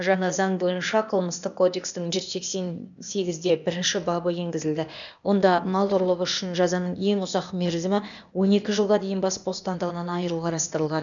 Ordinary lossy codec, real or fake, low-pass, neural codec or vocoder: none; fake; 7.2 kHz; codec, 16 kHz, 0.8 kbps, ZipCodec